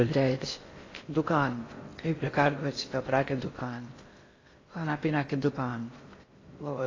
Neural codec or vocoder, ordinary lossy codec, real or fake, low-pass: codec, 16 kHz in and 24 kHz out, 0.6 kbps, FocalCodec, streaming, 4096 codes; AAC, 32 kbps; fake; 7.2 kHz